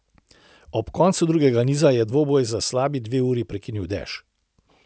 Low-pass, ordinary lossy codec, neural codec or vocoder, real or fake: none; none; none; real